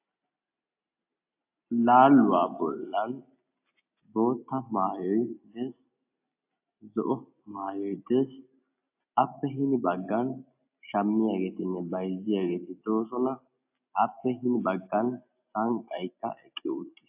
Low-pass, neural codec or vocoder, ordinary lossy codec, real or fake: 3.6 kHz; none; AAC, 32 kbps; real